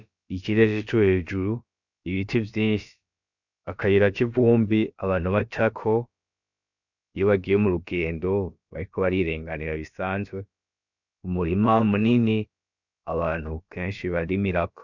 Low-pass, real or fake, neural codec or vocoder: 7.2 kHz; fake; codec, 16 kHz, about 1 kbps, DyCAST, with the encoder's durations